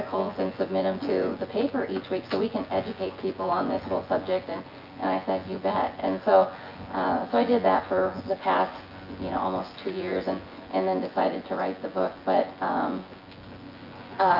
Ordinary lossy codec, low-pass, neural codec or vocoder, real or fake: Opus, 24 kbps; 5.4 kHz; vocoder, 24 kHz, 100 mel bands, Vocos; fake